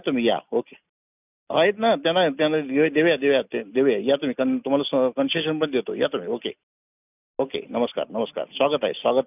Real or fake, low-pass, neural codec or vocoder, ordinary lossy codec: real; 3.6 kHz; none; none